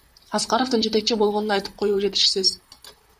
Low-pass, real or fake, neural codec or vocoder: 14.4 kHz; fake; vocoder, 44.1 kHz, 128 mel bands, Pupu-Vocoder